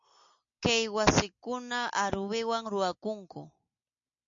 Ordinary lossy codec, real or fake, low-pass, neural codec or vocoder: MP3, 48 kbps; real; 7.2 kHz; none